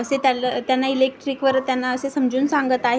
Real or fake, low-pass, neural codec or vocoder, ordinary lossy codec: real; none; none; none